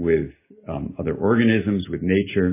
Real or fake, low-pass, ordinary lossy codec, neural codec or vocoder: real; 3.6 kHz; MP3, 16 kbps; none